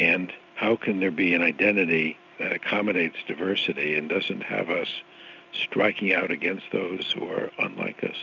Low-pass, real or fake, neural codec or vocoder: 7.2 kHz; real; none